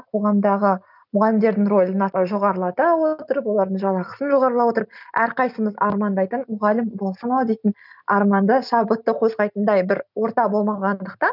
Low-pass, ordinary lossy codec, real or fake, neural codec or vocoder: 5.4 kHz; none; real; none